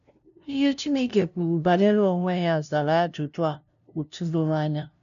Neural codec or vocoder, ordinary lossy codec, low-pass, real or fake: codec, 16 kHz, 0.5 kbps, FunCodec, trained on LibriTTS, 25 frames a second; none; 7.2 kHz; fake